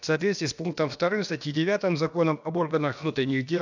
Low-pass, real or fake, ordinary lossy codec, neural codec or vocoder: 7.2 kHz; fake; none; codec, 16 kHz, about 1 kbps, DyCAST, with the encoder's durations